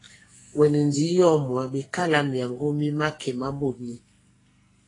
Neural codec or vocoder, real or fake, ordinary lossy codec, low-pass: codec, 44.1 kHz, 2.6 kbps, SNAC; fake; AAC, 32 kbps; 10.8 kHz